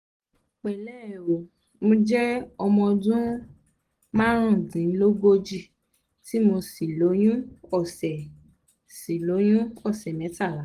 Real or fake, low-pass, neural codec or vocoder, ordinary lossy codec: real; 14.4 kHz; none; Opus, 24 kbps